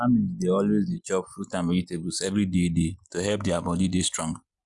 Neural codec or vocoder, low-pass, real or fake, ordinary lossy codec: none; 10.8 kHz; real; Opus, 64 kbps